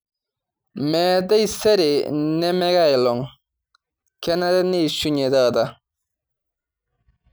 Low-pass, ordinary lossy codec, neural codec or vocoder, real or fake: none; none; none; real